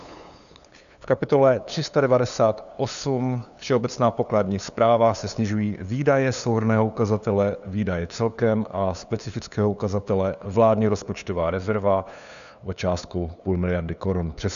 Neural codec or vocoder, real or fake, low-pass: codec, 16 kHz, 2 kbps, FunCodec, trained on LibriTTS, 25 frames a second; fake; 7.2 kHz